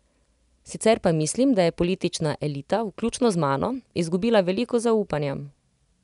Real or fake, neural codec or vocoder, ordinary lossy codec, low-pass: real; none; none; 10.8 kHz